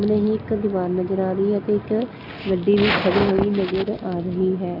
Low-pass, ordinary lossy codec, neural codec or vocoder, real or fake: 5.4 kHz; none; none; real